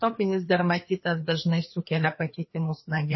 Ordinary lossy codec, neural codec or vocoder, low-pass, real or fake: MP3, 24 kbps; codec, 16 kHz, 2 kbps, FreqCodec, larger model; 7.2 kHz; fake